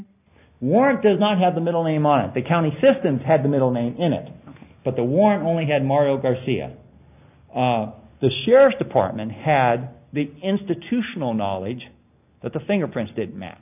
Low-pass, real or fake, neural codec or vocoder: 3.6 kHz; real; none